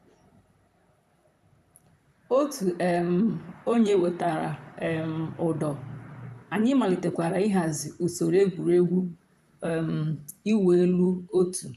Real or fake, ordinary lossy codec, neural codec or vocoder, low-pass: fake; none; vocoder, 44.1 kHz, 128 mel bands, Pupu-Vocoder; 14.4 kHz